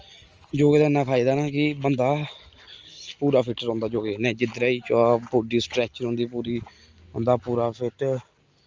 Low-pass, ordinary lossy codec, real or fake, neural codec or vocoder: 7.2 kHz; Opus, 24 kbps; real; none